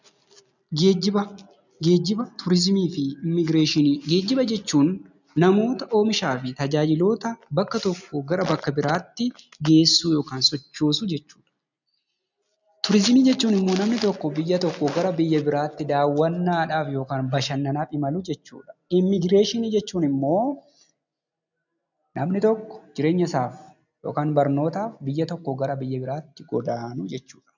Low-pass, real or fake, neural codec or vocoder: 7.2 kHz; real; none